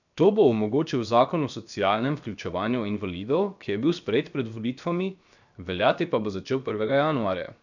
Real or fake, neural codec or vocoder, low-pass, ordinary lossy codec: fake; codec, 16 kHz, 0.7 kbps, FocalCodec; 7.2 kHz; none